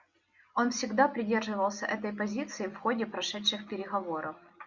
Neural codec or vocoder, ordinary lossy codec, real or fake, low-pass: none; Opus, 64 kbps; real; 7.2 kHz